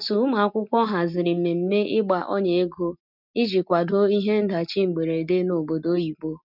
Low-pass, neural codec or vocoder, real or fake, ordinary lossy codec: 5.4 kHz; none; real; none